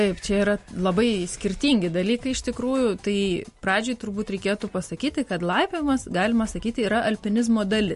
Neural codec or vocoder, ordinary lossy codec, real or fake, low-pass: none; MP3, 48 kbps; real; 14.4 kHz